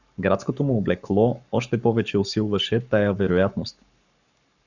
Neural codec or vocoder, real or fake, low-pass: codec, 44.1 kHz, 7.8 kbps, Pupu-Codec; fake; 7.2 kHz